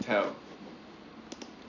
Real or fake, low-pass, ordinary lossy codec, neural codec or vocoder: real; 7.2 kHz; none; none